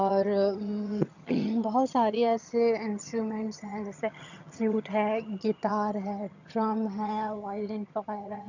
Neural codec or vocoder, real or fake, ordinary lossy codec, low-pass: vocoder, 22.05 kHz, 80 mel bands, HiFi-GAN; fake; none; 7.2 kHz